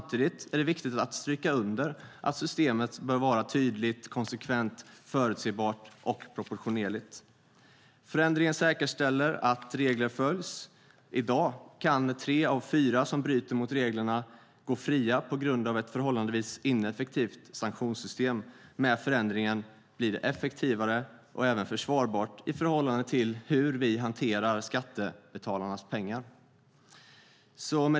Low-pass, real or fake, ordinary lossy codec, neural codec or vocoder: none; real; none; none